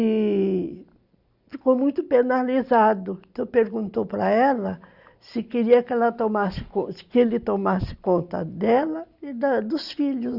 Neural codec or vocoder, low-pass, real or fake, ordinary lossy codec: none; 5.4 kHz; real; Opus, 64 kbps